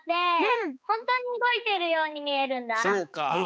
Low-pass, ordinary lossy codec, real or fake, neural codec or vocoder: none; none; fake; codec, 16 kHz, 4 kbps, X-Codec, HuBERT features, trained on balanced general audio